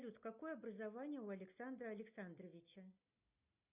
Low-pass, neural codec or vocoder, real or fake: 3.6 kHz; none; real